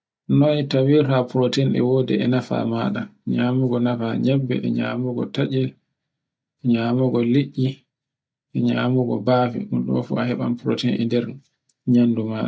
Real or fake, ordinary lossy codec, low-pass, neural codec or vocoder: real; none; none; none